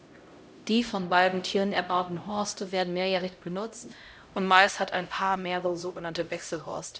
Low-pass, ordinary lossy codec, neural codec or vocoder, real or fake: none; none; codec, 16 kHz, 0.5 kbps, X-Codec, HuBERT features, trained on LibriSpeech; fake